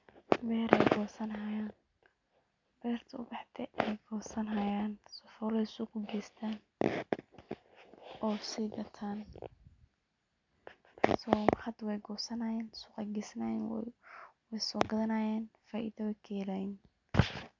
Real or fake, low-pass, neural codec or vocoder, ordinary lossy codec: real; 7.2 kHz; none; MP3, 64 kbps